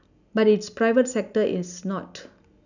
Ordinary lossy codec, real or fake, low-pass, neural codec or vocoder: none; real; 7.2 kHz; none